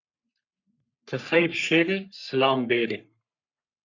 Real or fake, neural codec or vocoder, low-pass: fake; codec, 44.1 kHz, 3.4 kbps, Pupu-Codec; 7.2 kHz